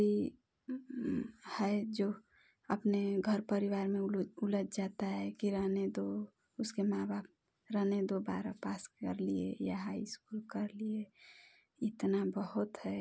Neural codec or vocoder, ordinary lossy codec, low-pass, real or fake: none; none; none; real